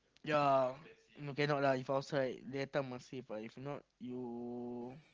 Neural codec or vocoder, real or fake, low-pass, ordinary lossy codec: none; real; 7.2 kHz; Opus, 16 kbps